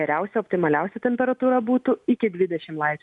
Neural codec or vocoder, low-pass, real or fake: none; 10.8 kHz; real